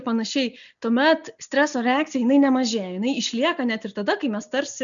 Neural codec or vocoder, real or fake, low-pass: none; real; 7.2 kHz